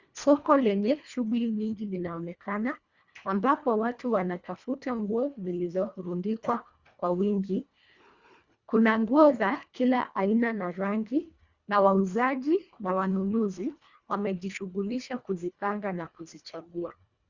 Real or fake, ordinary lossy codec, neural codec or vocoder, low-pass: fake; Opus, 64 kbps; codec, 24 kHz, 1.5 kbps, HILCodec; 7.2 kHz